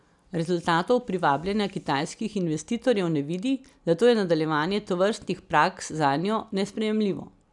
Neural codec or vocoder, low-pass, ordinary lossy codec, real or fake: none; 10.8 kHz; none; real